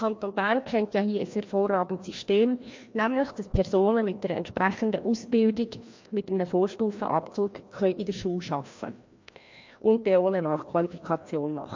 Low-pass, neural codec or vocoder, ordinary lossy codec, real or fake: 7.2 kHz; codec, 16 kHz, 1 kbps, FreqCodec, larger model; MP3, 48 kbps; fake